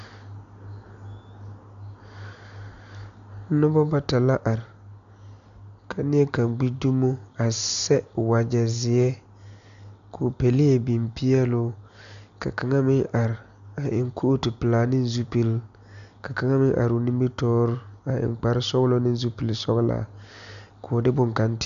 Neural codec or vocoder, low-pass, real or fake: none; 7.2 kHz; real